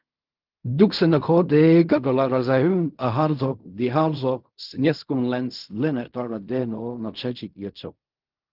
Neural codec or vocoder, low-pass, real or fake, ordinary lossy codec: codec, 16 kHz in and 24 kHz out, 0.4 kbps, LongCat-Audio-Codec, fine tuned four codebook decoder; 5.4 kHz; fake; Opus, 24 kbps